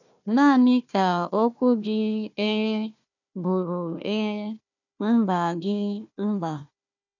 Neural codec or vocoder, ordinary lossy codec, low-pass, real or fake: codec, 16 kHz, 1 kbps, FunCodec, trained on Chinese and English, 50 frames a second; none; 7.2 kHz; fake